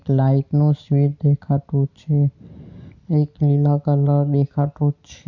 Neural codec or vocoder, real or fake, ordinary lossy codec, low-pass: none; real; none; 7.2 kHz